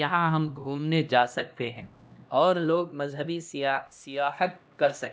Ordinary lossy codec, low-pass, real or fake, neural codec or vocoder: none; none; fake; codec, 16 kHz, 1 kbps, X-Codec, HuBERT features, trained on LibriSpeech